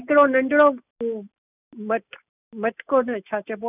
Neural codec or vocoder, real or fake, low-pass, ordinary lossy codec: none; real; 3.6 kHz; none